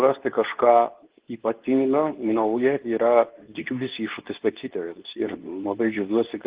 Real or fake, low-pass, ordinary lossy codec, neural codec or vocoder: fake; 3.6 kHz; Opus, 16 kbps; codec, 24 kHz, 0.9 kbps, WavTokenizer, medium speech release version 2